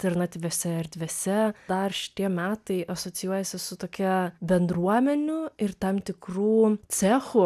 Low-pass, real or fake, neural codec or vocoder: 14.4 kHz; real; none